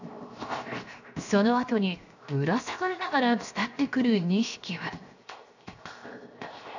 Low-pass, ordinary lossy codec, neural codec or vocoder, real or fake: 7.2 kHz; none; codec, 16 kHz, 0.7 kbps, FocalCodec; fake